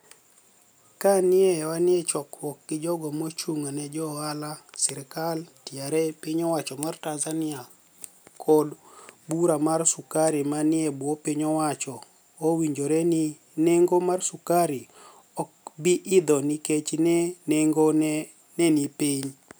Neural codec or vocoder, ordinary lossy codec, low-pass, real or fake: none; none; none; real